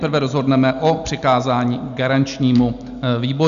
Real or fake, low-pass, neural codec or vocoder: real; 7.2 kHz; none